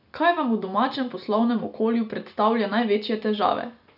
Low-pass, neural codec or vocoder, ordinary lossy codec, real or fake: 5.4 kHz; none; none; real